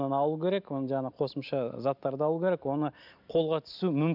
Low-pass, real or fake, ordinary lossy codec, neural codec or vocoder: 5.4 kHz; real; none; none